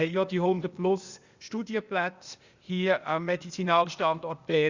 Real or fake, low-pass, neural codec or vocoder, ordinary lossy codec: fake; 7.2 kHz; codec, 16 kHz, 0.8 kbps, ZipCodec; Opus, 64 kbps